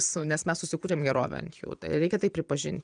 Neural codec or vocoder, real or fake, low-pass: vocoder, 22.05 kHz, 80 mel bands, Vocos; fake; 9.9 kHz